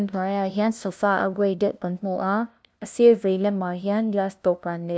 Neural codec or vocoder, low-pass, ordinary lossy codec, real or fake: codec, 16 kHz, 0.5 kbps, FunCodec, trained on LibriTTS, 25 frames a second; none; none; fake